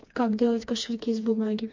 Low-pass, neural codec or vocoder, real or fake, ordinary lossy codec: 7.2 kHz; codec, 16 kHz, 2 kbps, FreqCodec, smaller model; fake; MP3, 48 kbps